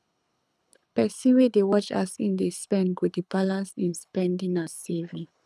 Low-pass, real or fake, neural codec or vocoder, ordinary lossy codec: none; fake; codec, 24 kHz, 6 kbps, HILCodec; none